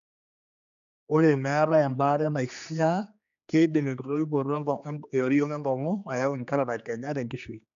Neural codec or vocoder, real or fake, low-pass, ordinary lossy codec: codec, 16 kHz, 2 kbps, X-Codec, HuBERT features, trained on general audio; fake; 7.2 kHz; AAC, 96 kbps